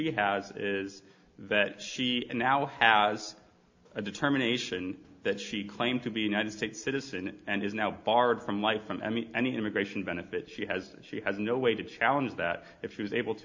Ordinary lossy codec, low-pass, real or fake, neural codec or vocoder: MP3, 48 kbps; 7.2 kHz; real; none